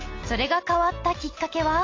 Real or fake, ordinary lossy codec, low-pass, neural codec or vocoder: real; AAC, 32 kbps; 7.2 kHz; none